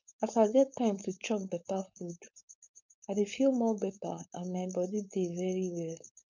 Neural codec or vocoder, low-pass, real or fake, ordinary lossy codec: codec, 16 kHz, 4.8 kbps, FACodec; 7.2 kHz; fake; none